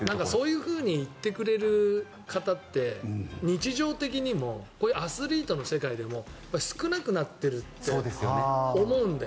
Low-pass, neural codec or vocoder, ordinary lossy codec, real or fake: none; none; none; real